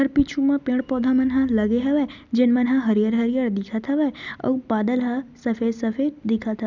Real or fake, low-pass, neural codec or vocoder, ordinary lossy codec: real; 7.2 kHz; none; none